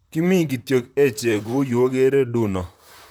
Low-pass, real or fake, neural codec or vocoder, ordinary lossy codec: 19.8 kHz; fake; vocoder, 44.1 kHz, 128 mel bands, Pupu-Vocoder; none